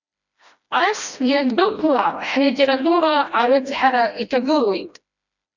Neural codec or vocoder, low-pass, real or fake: codec, 16 kHz, 1 kbps, FreqCodec, smaller model; 7.2 kHz; fake